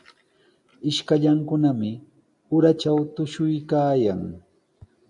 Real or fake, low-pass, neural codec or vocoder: real; 10.8 kHz; none